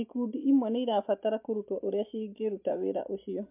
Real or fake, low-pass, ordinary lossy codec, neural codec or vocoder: real; 3.6 kHz; MP3, 32 kbps; none